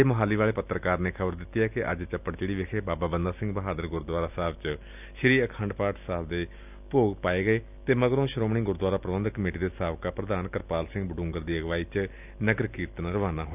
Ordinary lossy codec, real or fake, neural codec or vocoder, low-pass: none; real; none; 3.6 kHz